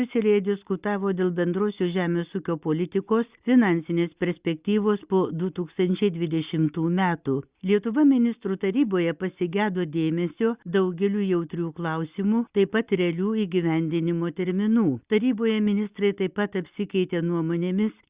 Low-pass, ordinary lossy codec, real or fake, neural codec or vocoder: 3.6 kHz; Opus, 64 kbps; real; none